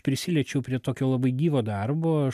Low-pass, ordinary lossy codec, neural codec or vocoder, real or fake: 14.4 kHz; AAC, 96 kbps; none; real